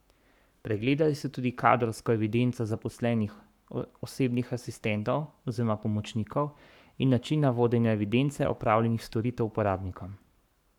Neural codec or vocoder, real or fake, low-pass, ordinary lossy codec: codec, 44.1 kHz, 7.8 kbps, Pupu-Codec; fake; 19.8 kHz; none